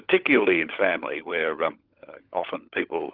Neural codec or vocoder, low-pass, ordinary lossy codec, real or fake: codec, 16 kHz, 16 kbps, FunCodec, trained on LibriTTS, 50 frames a second; 5.4 kHz; Opus, 32 kbps; fake